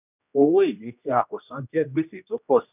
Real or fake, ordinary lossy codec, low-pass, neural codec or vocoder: fake; none; 3.6 kHz; codec, 16 kHz, 1 kbps, X-Codec, HuBERT features, trained on general audio